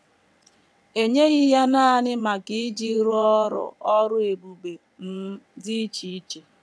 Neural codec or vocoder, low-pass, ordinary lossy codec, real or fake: vocoder, 22.05 kHz, 80 mel bands, WaveNeXt; none; none; fake